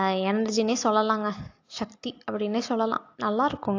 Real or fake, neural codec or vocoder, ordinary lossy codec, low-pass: real; none; AAC, 48 kbps; 7.2 kHz